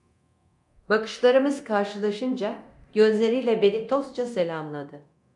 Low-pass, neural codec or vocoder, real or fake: 10.8 kHz; codec, 24 kHz, 0.9 kbps, DualCodec; fake